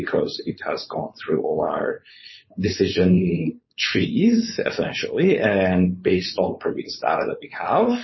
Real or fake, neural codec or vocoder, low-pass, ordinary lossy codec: fake; codec, 16 kHz, 4.8 kbps, FACodec; 7.2 kHz; MP3, 24 kbps